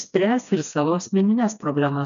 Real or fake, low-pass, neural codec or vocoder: fake; 7.2 kHz; codec, 16 kHz, 2 kbps, FreqCodec, smaller model